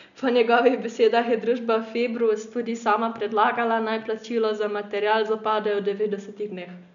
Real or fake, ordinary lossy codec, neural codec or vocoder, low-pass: real; none; none; 7.2 kHz